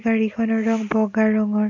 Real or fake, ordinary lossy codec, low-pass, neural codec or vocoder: real; none; 7.2 kHz; none